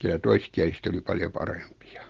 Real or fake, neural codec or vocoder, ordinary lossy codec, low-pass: real; none; Opus, 16 kbps; 7.2 kHz